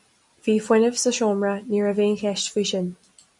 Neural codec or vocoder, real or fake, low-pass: none; real; 10.8 kHz